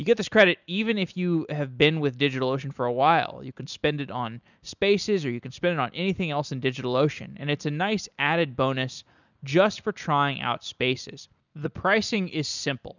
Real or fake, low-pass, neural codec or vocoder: real; 7.2 kHz; none